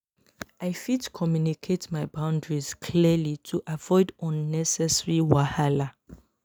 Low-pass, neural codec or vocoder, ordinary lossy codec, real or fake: none; none; none; real